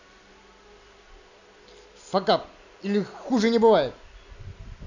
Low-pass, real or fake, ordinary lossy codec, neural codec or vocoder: 7.2 kHz; real; none; none